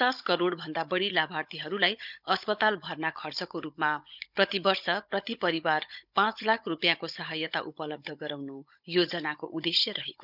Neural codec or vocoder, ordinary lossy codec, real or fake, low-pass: codec, 16 kHz, 16 kbps, FunCodec, trained on Chinese and English, 50 frames a second; none; fake; 5.4 kHz